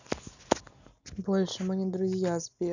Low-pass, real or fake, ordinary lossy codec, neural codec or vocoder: 7.2 kHz; real; none; none